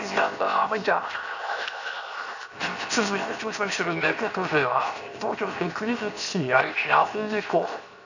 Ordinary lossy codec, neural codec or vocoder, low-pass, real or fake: none; codec, 16 kHz, 0.7 kbps, FocalCodec; 7.2 kHz; fake